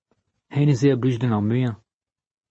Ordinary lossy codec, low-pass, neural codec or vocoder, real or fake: MP3, 32 kbps; 9.9 kHz; none; real